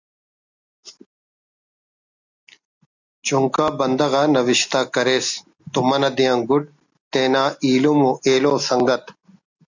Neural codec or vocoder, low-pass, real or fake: none; 7.2 kHz; real